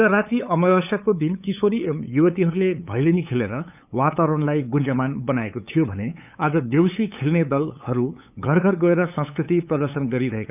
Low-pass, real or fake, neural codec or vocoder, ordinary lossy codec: 3.6 kHz; fake; codec, 16 kHz, 8 kbps, FunCodec, trained on LibriTTS, 25 frames a second; none